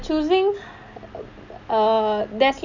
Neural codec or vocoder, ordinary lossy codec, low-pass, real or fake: none; none; 7.2 kHz; real